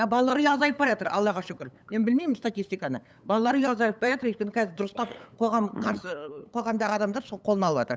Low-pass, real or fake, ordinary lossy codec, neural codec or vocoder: none; fake; none; codec, 16 kHz, 8 kbps, FunCodec, trained on LibriTTS, 25 frames a second